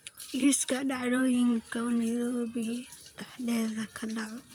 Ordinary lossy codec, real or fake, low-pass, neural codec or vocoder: none; fake; none; vocoder, 44.1 kHz, 128 mel bands, Pupu-Vocoder